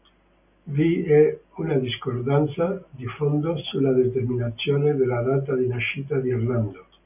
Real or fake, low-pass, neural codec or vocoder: real; 3.6 kHz; none